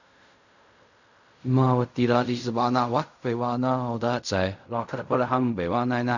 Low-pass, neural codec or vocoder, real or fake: 7.2 kHz; codec, 16 kHz in and 24 kHz out, 0.4 kbps, LongCat-Audio-Codec, fine tuned four codebook decoder; fake